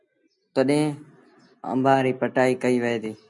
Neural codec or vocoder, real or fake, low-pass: none; real; 10.8 kHz